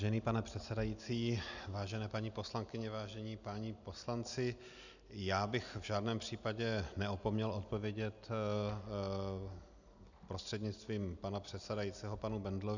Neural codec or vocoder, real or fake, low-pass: none; real; 7.2 kHz